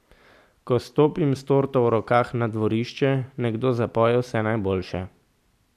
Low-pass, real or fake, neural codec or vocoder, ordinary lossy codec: 14.4 kHz; real; none; none